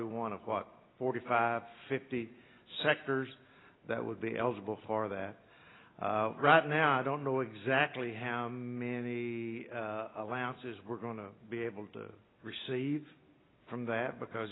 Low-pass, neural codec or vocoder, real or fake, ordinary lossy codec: 7.2 kHz; none; real; AAC, 16 kbps